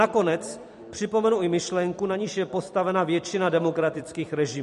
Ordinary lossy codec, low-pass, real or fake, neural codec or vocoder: MP3, 48 kbps; 14.4 kHz; fake; vocoder, 44.1 kHz, 128 mel bands every 256 samples, BigVGAN v2